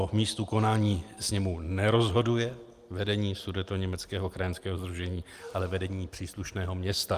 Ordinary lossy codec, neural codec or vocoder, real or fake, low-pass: Opus, 32 kbps; none; real; 14.4 kHz